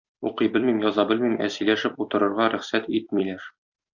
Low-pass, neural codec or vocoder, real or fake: 7.2 kHz; vocoder, 44.1 kHz, 128 mel bands every 256 samples, BigVGAN v2; fake